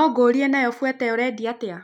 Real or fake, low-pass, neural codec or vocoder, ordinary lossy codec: real; 19.8 kHz; none; none